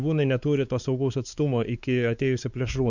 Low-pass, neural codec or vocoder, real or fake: 7.2 kHz; codec, 16 kHz, 4 kbps, X-Codec, WavLM features, trained on Multilingual LibriSpeech; fake